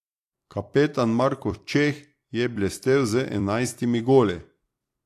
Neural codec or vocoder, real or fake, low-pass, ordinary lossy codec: vocoder, 44.1 kHz, 128 mel bands every 256 samples, BigVGAN v2; fake; 14.4 kHz; AAC, 64 kbps